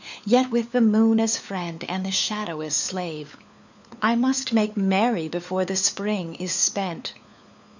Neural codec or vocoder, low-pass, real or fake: codec, 16 kHz, 4 kbps, FunCodec, trained on LibriTTS, 50 frames a second; 7.2 kHz; fake